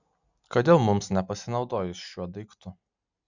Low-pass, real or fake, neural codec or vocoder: 7.2 kHz; real; none